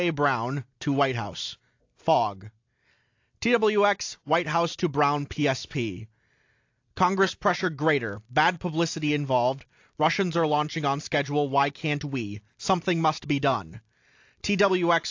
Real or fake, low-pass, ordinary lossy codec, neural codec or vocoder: real; 7.2 kHz; AAC, 48 kbps; none